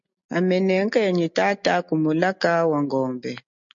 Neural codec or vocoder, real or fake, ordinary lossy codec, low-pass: none; real; MP3, 48 kbps; 7.2 kHz